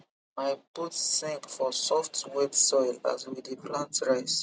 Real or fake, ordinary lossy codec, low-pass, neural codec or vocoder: real; none; none; none